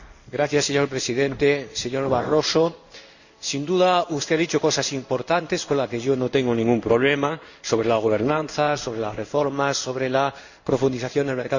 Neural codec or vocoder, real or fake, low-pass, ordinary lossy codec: codec, 16 kHz in and 24 kHz out, 1 kbps, XY-Tokenizer; fake; 7.2 kHz; none